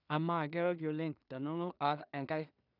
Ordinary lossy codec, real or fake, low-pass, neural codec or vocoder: none; fake; 5.4 kHz; codec, 16 kHz in and 24 kHz out, 0.4 kbps, LongCat-Audio-Codec, two codebook decoder